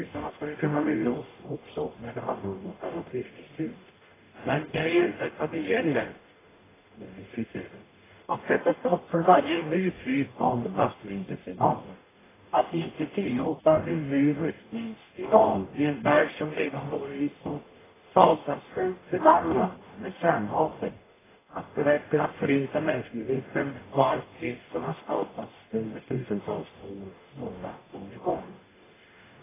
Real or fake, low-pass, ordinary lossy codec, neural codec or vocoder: fake; 3.6 kHz; AAC, 16 kbps; codec, 44.1 kHz, 0.9 kbps, DAC